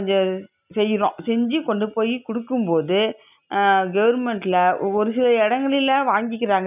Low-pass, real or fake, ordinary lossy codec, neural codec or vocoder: 3.6 kHz; real; none; none